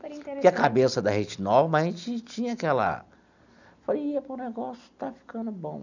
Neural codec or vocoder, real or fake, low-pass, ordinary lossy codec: none; real; 7.2 kHz; none